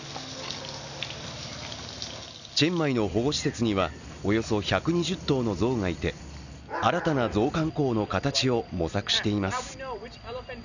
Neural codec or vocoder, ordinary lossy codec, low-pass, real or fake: none; none; 7.2 kHz; real